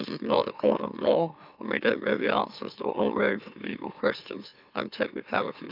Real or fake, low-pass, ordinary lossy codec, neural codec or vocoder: fake; 5.4 kHz; none; autoencoder, 44.1 kHz, a latent of 192 numbers a frame, MeloTTS